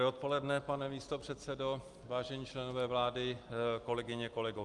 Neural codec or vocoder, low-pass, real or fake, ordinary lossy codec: none; 10.8 kHz; real; Opus, 32 kbps